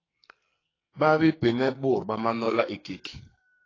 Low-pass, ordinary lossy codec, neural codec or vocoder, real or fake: 7.2 kHz; AAC, 32 kbps; codec, 44.1 kHz, 2.6 kbps, SNAC; fake